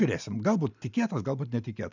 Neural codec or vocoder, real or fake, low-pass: none; real; 7.2 kHz